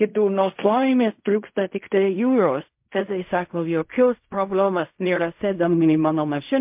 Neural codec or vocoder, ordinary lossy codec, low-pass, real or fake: codec, 16 kHz in and 24 kHz out, 0.4 kbps, LongCat-Audio-Codec, fine tuned four codebook decoder; MP3, 32 kbps; 3.6 kHz; fake